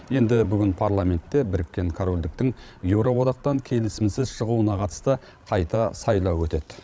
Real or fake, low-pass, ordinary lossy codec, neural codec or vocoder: fake; none; none; codec, 16 kHz, 16 kbps, FreqCodec, larger model